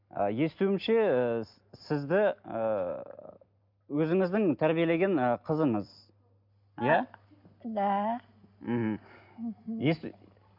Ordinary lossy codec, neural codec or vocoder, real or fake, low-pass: MP3, 48 kbps; none; real; 5.4 kHz